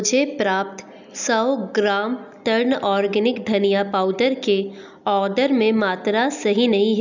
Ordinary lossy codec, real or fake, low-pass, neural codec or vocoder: none; real; 7.2 kHz; none